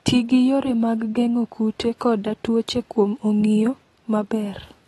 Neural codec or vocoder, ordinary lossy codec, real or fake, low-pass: none; AAC, 32 kbps; real; 19.8 kHz